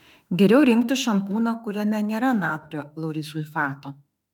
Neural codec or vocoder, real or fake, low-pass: autoencoder, 48 kHz, 32 numbers a frame, DAC-VAE, trained on Japanese speech; fake; 19.8 kHz